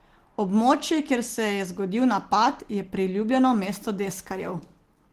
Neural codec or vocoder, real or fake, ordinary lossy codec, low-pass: none; real; Opus, 16 kbps; 14.4 kHz